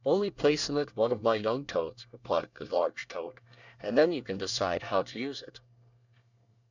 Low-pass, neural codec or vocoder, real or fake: 7.2 kHz; codec, 24 kHz, 1 kbps, SNAC; fake